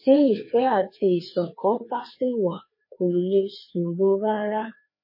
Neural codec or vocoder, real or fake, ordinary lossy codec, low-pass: codec, 16 kHz, 2 kbps, FreqCodec, larger model; fake; MP3, 24 kbps; 5.4 kHz